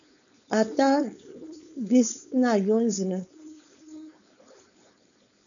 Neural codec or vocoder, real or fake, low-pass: codec, 16 kHz, 4.8 kbps, FACodec; fake; 7.2 kHz